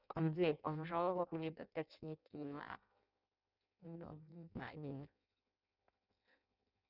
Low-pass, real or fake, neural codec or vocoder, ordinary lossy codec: 5.4 kHz; fake; codec, 16 kHz in and 24 kHz out, 0.6 kbps, FireRedTTS-2 codec; none